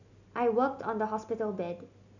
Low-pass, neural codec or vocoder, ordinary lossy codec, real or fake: 7.2 kHz; none; none; real